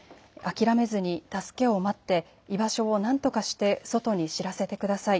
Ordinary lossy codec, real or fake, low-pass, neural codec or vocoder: none; real; none; none